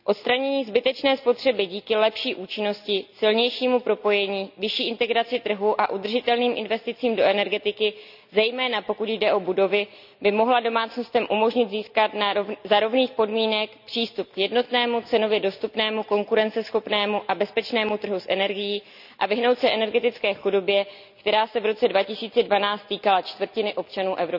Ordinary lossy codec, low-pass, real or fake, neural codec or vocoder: none; 5.4 kHz; real; none